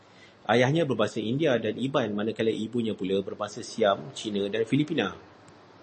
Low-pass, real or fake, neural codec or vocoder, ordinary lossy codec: 10.8 kHz; real; none; MP3, 32 kbps